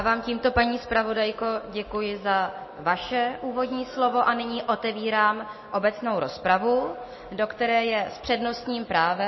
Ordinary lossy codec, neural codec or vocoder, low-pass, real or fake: MP3, 24 kbps; none; 7.2 kHz; real